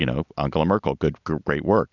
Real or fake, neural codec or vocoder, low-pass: real; none; 7.2 kHz